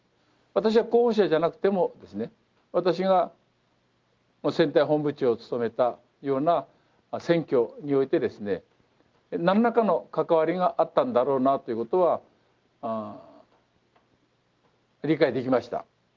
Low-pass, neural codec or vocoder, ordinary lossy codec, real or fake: 7.2 kHz; none; Opus, 32 kbps; real